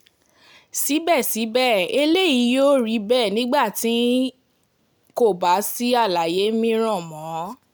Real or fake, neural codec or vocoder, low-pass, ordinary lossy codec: real; none; none; none